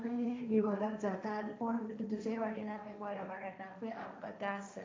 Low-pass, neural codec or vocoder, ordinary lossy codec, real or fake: none; codec, 16 kHz, 1.1 kbps, Voila-Tokenizer; none; fake